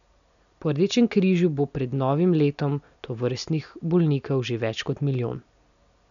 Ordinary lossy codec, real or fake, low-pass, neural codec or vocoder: none; real; 7.2 kHz; none